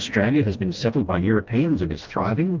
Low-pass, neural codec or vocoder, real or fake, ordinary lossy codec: 7.2 kHz; codec, 16 kHz, 1 kbps, FreqCodec, smaller model; fake; Opus, 24 kbps